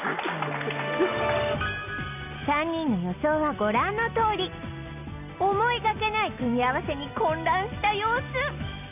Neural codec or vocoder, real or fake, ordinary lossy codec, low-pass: none; real; none; 3.6 kHz